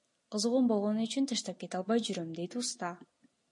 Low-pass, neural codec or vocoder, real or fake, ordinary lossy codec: 10.8 kHz; none; real; MP3, 48 kbps